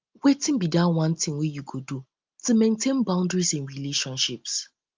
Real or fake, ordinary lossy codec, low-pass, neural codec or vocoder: real; Opus, 24 kbps; 7.2 kHz; none